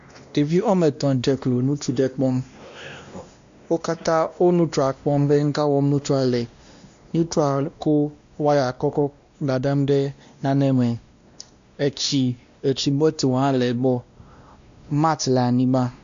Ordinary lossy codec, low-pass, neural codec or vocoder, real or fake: MP3, 64 kbps; 7.2 kHz; codec, 16 kHz, 1 kbps, X-Codec, WavLM features, trained on Multilingual LibriSpeech; fake